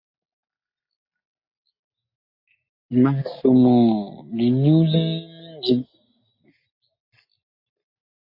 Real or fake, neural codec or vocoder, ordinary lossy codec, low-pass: real; none; MP3, 24 kbps; 5.4 kHz